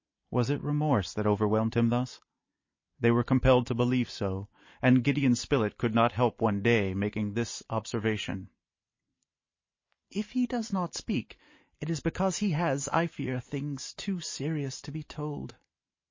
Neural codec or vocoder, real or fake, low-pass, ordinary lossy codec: vocoder, 44.1 kHz, 128 mel bands every 512 samples, BigVGAN v2; fake; 7.2 kHz; MP3, 32 kbps